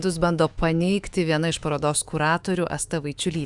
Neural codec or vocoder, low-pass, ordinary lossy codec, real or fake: autoencoder, 48 kHz, 128 numbers a frame, DAC-VAE, trained on Japanese speech; 10.8 kHz; Opus, 64 kbps; fake